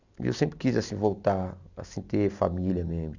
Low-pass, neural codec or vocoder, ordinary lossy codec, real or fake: 7.2 kHz; none; none; real